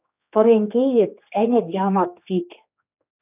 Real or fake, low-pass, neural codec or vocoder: fake; 3.6 kHz; codec, 16 kHz, 2 kbps, X-Codec, HuBERT features, trained on general audio